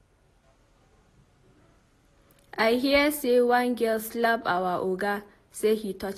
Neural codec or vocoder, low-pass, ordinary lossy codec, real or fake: none; 14.4 kHz; AAC, 48 kbps; real